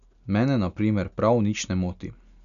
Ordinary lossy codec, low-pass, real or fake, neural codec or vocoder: none; 7.2 kHz; real; none